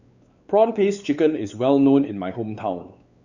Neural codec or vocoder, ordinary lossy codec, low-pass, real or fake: codec, 16 kHz, 4 kbps, X-Codec, WavLM features, trained on Multilingual LibriSpeech; Opus, 64 kbps; 7.2 kHz; fake